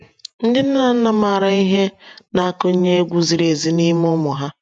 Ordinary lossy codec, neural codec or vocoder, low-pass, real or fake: none; vocoder, 48 kHz, 128 mel bands, Vocos; 9.9 kHz; fake